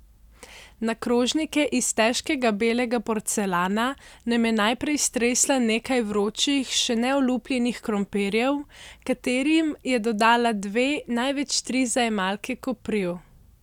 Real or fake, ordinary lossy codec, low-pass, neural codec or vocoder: real; none; 19.8 kHz; none